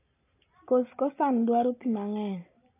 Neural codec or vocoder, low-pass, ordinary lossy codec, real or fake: none; 3.6 kHz; AAC, 24 kbps; real